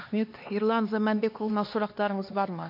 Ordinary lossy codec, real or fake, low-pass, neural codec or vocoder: MP3, 32 kbps; fake; 5.4 kHz; codec, 16 kHz, 2 kbps, X-Codec, HuBERT features, trained on LibriSpeech